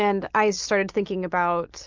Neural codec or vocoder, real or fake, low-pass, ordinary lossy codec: none; real; 7.2 kHz; Opus, 24 kbps